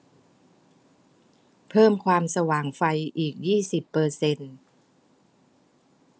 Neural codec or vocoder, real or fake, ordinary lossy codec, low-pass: none; real; none; none